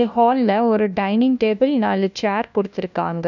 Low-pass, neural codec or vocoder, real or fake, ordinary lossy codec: 7.2 kHz; codec, 16 kHz, 1 kbps, FunCodec, trained on LibriTTS, 50 frames a second; fake; none